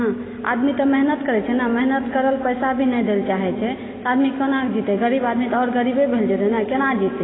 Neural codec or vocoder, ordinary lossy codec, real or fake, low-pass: none; AAC, 16 kbps; real; 7.2 kHz